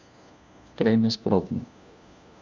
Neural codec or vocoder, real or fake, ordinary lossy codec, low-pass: codec, 16 kHz, 1 kbps, FunCodec, trained on LibriTTS, 50 frames a second; fake; none; none